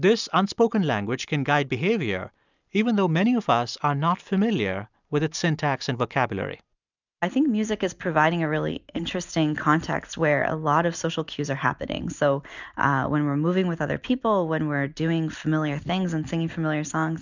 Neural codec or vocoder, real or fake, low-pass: none; real; 7.2 kHz